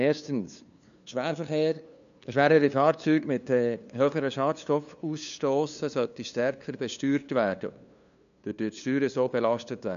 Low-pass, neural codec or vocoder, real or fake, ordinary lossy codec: 7.2 kHz; codec, 16 kHz, 2 kbps, FunCodec, trained on LibriTTS, 25 frames a second; fake; none